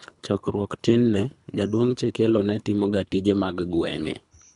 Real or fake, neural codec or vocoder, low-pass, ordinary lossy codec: fake; codec, 24 kHz, 3 kbps, HILCodec; 10.8 kHz; MP3, 96 kbps